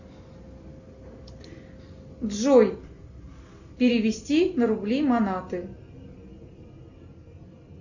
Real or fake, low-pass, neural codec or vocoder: real; 7.2 kHz; none